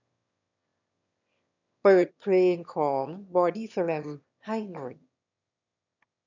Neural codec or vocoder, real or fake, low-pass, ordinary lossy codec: autoencoder, 22.05 kHz, a latent of 192 numbers a frame, VITS, trained on one speaker; fake; 7.2 kHz; none